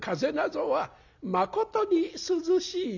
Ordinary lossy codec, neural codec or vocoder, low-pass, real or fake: none; none; 7.2 kHz; real